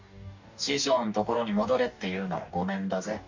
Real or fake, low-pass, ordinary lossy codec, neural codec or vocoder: fake; 7.2 kHz; MP3, 64 kbps; codec, 44.1 kHz, 2.6 kbps, DAC